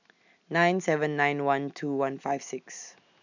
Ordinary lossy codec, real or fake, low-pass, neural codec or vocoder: none; real; 7.2 kHz; none